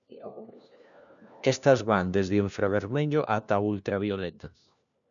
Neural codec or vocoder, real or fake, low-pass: codec, 16 kHz, 1 kbps, FunCodec, trained on LibriTTS, 50 frames a second; fake; 7.2 kHz